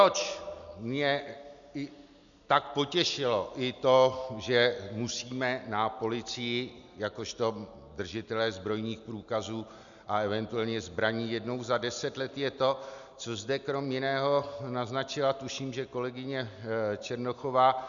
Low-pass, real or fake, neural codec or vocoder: 7.2 kHz; real; none